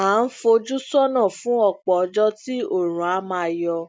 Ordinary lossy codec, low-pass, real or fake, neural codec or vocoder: none; none; real; none